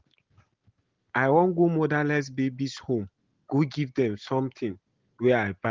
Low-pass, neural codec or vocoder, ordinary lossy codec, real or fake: 7.2 kHz; none; Opus, 16 kbps; real